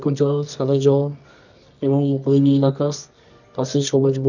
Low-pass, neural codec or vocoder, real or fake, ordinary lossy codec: 7.2 kHz; codec, 24 kHz, 0.9 kbps, WavTokenizer, medium music audio release; fake; none